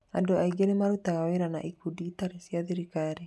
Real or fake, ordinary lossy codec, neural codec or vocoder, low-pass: real; none; none; none